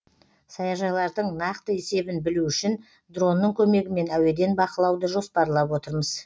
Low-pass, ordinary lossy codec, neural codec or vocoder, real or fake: none; none; none; real